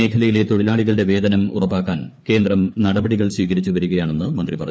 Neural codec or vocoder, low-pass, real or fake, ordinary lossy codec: codec, 16 kHz, 4 kbps, FreqCodec, larger model; none; fake; none